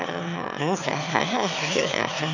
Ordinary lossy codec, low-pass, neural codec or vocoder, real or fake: none; 7.2 kHz; autoencoder, 22.05 kHz, a latent of 192 numbers a frame, VITS, trained on one speaker; fake